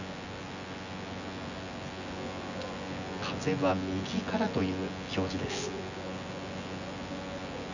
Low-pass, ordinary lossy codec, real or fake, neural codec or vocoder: 7.2 kHz; none; fake; vocoder, 24 kHz, 100 mel bands, Vocos